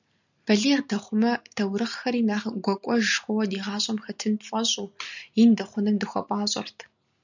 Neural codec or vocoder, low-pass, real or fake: none; 7.2 kHz; real